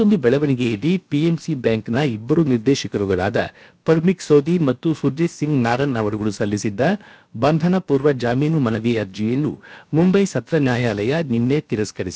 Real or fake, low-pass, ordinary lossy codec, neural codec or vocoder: fake; none; none; codec, 16 kHz, about 1 kbps, DyCAST, with the encoder's durations